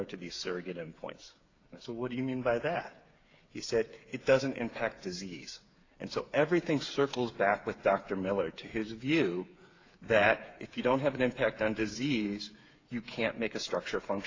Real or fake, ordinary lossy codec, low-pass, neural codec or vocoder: fake; AAC, 32 kbps; 7.2 kHz; codec, 16 kHz, 8 kbps, FreqCodec, smaller model